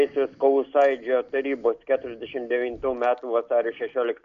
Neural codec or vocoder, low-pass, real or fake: none; 7.2 kHz; real